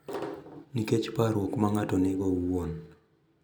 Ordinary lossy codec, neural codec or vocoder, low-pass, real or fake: none; none; none; real